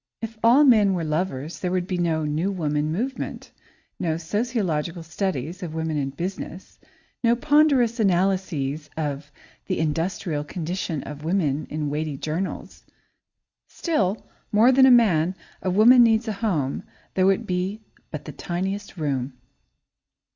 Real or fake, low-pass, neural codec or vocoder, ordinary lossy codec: real; 7.2 kHz; none; Opus, 64 kbps